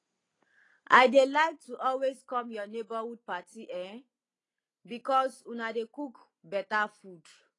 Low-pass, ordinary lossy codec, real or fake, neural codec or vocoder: 10.8 kHz; AAC, 32 kbps; real; none